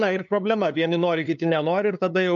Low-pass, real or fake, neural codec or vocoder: 7.2 kHz; fake; codec, 16 kHz, 2 kbps, FunCodec, trained on LibriTTS, 25 frames a second